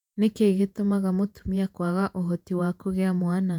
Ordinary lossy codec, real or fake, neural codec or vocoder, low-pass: none; fake; vocoder, 48 kHz, 128 mel bands, Vocos; 19.8 kHz